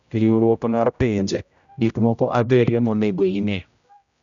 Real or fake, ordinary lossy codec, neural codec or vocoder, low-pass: fake; none; codec, 16 kHz, 0.5 kbps, X-Codec, HuBERT features, trained on general audio; 7.2 kHz